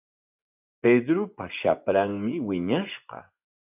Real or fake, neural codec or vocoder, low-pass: fake; vocoder, 24 kHz, 100 mel bands, Vocos; 3.6 kHz